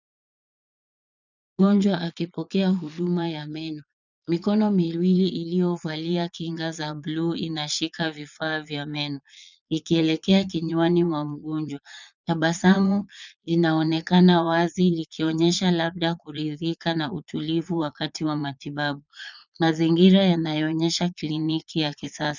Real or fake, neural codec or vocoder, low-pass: fake; vocoder, 22.05 kHz, 80 mel bands, Vocos; 7.2 kHz